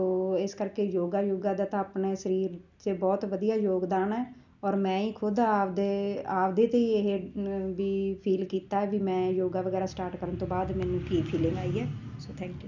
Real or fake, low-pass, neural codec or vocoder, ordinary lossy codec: real; 7.2 kHz; none; none